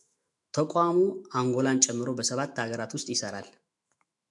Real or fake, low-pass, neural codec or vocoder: fake; 10.8 kHz; autoencoder, 48 kHz, 128 numbers a frame, DAC-VAE, trained on Japanese speech